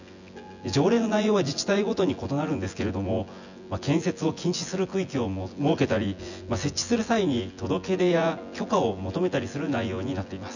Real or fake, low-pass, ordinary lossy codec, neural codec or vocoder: fake; 7.2 kHz; none; vocoder, 24 kHz, 100 mel bands, Vocos